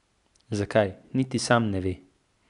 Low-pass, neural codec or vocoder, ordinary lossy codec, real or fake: 10.8 kHz; none; none; real